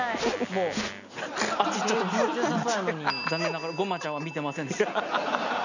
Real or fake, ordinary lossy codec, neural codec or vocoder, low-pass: real; none; none; 7.2 kHz